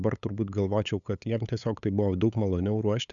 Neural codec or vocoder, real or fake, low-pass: codec, 16 kHz, 8 kbps, FunCodec, trained on LibriTTS, 25 frames a second; fake; 7.2 kHz